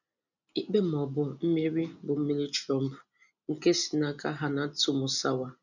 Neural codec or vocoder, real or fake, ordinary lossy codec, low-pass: none; real; none; 7.2 kHz